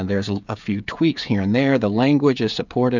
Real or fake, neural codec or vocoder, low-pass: fake; codec, 16 kHz, 16 kbps, FreqCodec, smaller model; 7.2 kHz